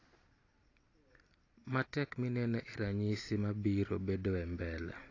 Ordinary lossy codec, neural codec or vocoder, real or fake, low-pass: AAC, 32 kbps; none; real; 7.2 kHz